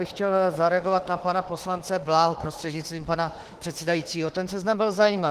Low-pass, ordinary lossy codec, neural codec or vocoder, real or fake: 14.4 kHz; Opus, 16 kbps; autoencoder, 48 kHz, 32 numbers a frame, DAC-VAE, trained on Japanese speech; fake